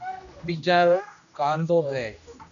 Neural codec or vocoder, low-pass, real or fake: codec, 16 kHz, 1 kbps, X-Codec, HuBERT features, trained on general audio; 7.2 kHz; fake